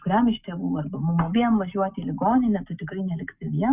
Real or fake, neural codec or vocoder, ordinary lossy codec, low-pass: real; none; Opus, 64 kbps; 3.6 kHz